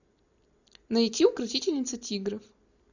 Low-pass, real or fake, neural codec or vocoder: 7.2 kHz; real; none